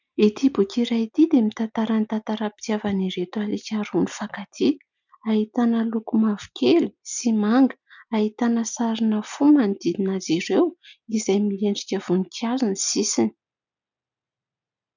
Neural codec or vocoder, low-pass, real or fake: none; 7.2 kHz; real